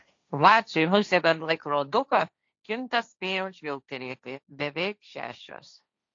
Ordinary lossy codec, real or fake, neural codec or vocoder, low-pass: AAC, 48 kbps; fake; codec, 16 kHz, 1.1 kbps, Voila-Tokenizer; 7.2 kHz